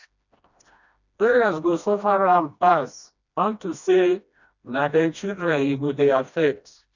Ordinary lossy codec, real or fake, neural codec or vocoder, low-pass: none; fake; codec, 16 kHz, 1 kbps, FreqCodec, smaller model; 7.2 kHz